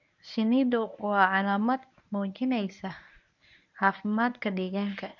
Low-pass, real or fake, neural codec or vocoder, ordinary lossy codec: 7.2 kHz; fake; codec, 24 kHz, 0.9 kbps, WavTokenizer, medium speech release version 1; none